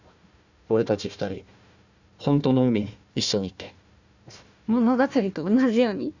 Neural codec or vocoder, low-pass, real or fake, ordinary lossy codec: codec, 16 kHz, 1 kbps, FunCodec, trained on Chinese and English, 50 frames a second; 7.2 kHz; fake; none